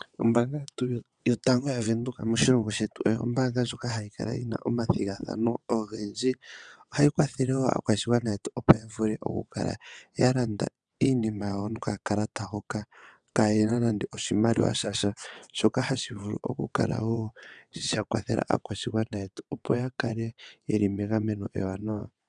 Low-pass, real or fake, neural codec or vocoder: 9.9 kHz; fake; vocoder, 22.05 kHz, 80 mel bands, WaveNeXt